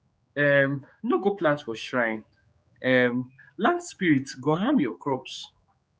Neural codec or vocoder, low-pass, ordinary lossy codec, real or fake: codec, 16 kHz, 4 kbps, X-Codec, HuBERT features, trained on general audio; none; none; fake